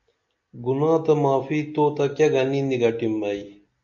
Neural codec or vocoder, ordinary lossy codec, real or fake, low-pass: none; AAC, 64 kbps; real; 7.2 kHz